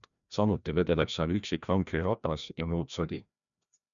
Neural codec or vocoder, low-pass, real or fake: codec, 16 kHz, 1 kbps, FreqCodec, larger model; 7.2 kHz; fake